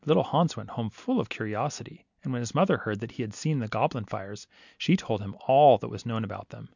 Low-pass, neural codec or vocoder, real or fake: 7.2 kHz; none; real